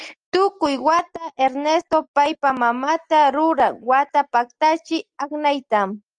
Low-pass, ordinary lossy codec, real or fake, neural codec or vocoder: 9.9 kHz; Opus, 32 kbps; real; none